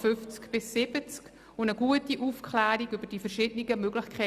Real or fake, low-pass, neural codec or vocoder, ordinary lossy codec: real; 14.4 kHz; none; Opus, 64 kbps